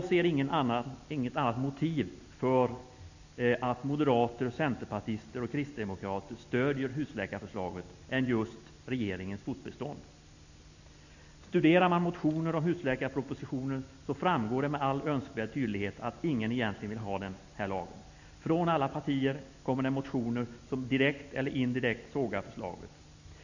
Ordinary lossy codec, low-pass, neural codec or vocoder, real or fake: none; 7.2 kHz; none; real